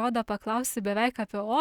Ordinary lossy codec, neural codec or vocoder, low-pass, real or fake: Opus, 64 kbps; none; 19.8 kHz; real